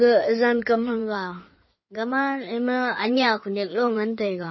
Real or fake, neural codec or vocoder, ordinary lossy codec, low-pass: fake; codec, 16 kHz in and 24 kHz out, 2.2 kbps, FireRedTTS-2 codec; MP3, 24 kbps; 7.2 kHz